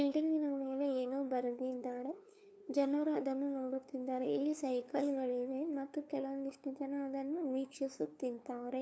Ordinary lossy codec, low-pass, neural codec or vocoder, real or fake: none; none; codec, 16 kHz, 2 kbps, FunCodec, trained on LibriTTS, 25 frames a second; fake